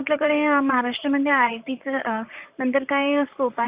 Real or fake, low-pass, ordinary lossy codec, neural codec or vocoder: fake; 3.6 kHz; Opus, 64 kbps; vocoder, 44.1 kHz, 128 mel bands, Pupu-Vocoder